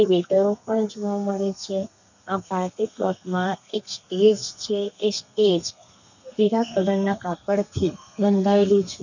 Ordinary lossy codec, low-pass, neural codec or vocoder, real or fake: none; 7.2 kHz; codec, 32 kHz, 1.9 kbps, SNAC; fake